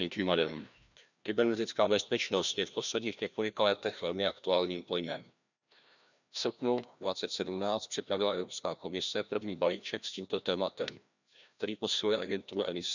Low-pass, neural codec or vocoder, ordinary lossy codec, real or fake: 7.2 kHz; codec, 16 kHz, 1 kbps, FreqCodec, larger model; none; fake